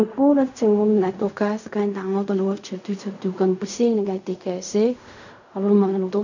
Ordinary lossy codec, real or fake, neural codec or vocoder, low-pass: none; fake; codec, 16 kHz in and 24 kHz out, 0.4 kbps, LongCat-Audio-Codec, fine tuned four codebook decoder; 7.2 kHz